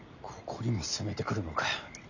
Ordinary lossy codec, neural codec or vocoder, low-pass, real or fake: none; none; 7.2 kHz; real